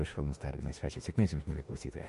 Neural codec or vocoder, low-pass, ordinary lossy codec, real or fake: autoencoder, 48 kHz, 32 numbers a frame, DAC-VAE, trained on Japanese speech; 14.4 kHz; MP3, 48 kbps; fake